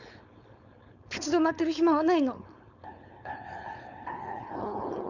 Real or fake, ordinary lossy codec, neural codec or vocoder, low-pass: fake; none; codec, 16 kHz, 4.8 kbps, FACodec; 7.2 kHz